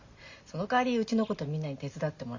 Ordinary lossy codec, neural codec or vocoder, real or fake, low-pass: Opus, 64 kbps; none; real; 7.2 kHz